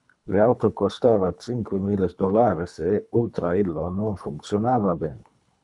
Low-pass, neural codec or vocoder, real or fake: 10.8 kHz; codec, 24 kHz, 3 kbps, HILCodec; fake